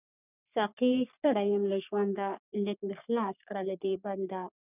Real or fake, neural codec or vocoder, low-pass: fake; codec, 44.1 kHz, 3.4 kbps, Pupu-Codec; 3.6 kHz